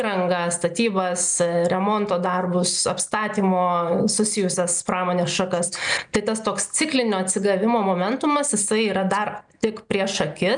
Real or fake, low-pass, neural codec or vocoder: real; 9.9 kHz; none